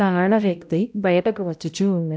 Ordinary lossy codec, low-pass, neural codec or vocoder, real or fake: none; none; codec, 16 kHz, 0.5 kbps, X-Codec, HuBERT features, trained on balanced general audio; fake